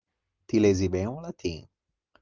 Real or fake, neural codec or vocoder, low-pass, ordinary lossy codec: real; none; 7.2 kHz; Opus, 24 kbps